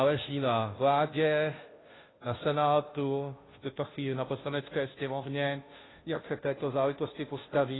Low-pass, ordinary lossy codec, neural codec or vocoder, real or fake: 7.2 kHz; AAC, 16 kbps; codec, 16 kHz, 0.5 kbps, FunCodec, trained on Chinese and English, 25 frames a second; fake